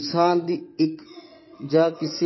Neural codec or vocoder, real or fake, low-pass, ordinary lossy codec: vocoder, 22.05 kHz, 80 mel bands, WaveNeXt; fake; 7.2 kHz; MP3, 24 kbps